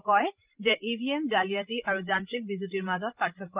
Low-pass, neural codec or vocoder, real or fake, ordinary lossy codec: 3.6 kHz; codec, 16 kHz, 16 kbps, FreqCodec, larger model; fake; Opus, 32 kbps